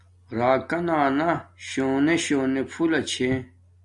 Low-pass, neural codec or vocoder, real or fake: 10.8 kHz; none; real